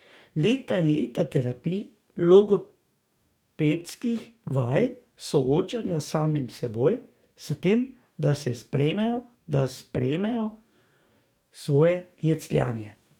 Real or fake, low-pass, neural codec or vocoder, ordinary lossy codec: fake; 19.8 kHz; codec, 44.1 kHz, 2.6 kbps, DAC; none